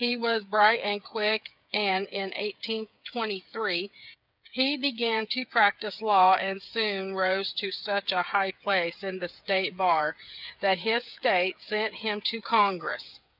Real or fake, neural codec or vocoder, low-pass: fake; codec, 16 kHz, 8 kbps, FreqCodec, smaller model; 5.4 kHz